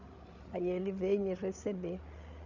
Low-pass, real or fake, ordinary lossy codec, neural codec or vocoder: 7.2 kHz; fake; none; codec, 16 kHz, 8 kbps, FreqCodec, larger model